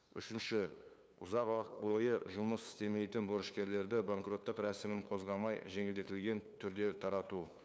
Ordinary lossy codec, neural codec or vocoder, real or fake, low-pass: none; codec, 16 kHz, 2 kbps, FunCodec, trained on LibriTTS, 25 frames a second; fake; none